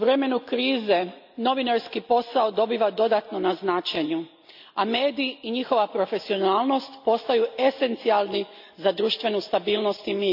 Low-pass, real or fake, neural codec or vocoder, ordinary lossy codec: 5.4 kHz; real; none; AAC, 48 kbps